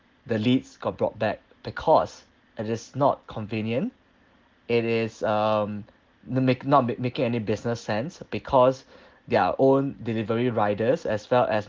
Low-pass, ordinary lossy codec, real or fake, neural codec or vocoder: 7.2 kHz; Opus, 16 kbps; real; none